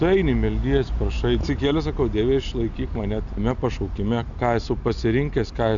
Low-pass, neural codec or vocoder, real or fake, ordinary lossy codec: 7.2 kHz; none; real; MP3, 96 kbps